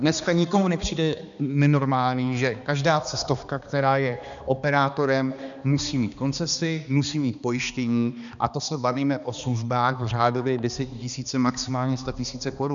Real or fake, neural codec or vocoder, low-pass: fake; codec, 16 kHz, 2 kbps, X-Codec, HuBERT features, trained on balanced general audio; 7.2 kHz